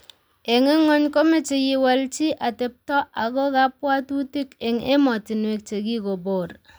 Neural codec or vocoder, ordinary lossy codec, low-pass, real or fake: none; none; none; real